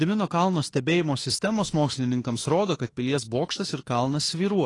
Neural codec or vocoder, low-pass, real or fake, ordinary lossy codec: autoencoder, 48 kHz, 32 numbers a frame, DAC-VAE, trained on Japanese speech; 10.8 kHz; fake; AAC, 32 kbps